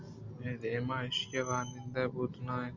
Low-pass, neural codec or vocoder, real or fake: 7.2 kHz; none; real